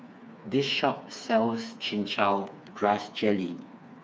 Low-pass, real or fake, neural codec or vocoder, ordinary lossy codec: none; fake; codec, 16 kHz, 4 kbps, FreqCodec, smaller model; none